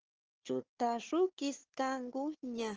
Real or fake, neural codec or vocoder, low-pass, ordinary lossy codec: fake; codec, 16 kHz, 4 kbps, FunCodec, trained on Chinese and English, 50 frames a second; 7.2 kHz; Opus, 16 kbps